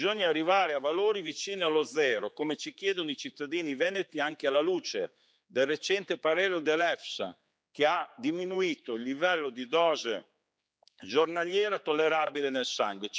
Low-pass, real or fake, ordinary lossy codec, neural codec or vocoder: none; fake; none; codec, 16 kHz, 4 kbps, X-Codec, HuBERT features, trained on general audio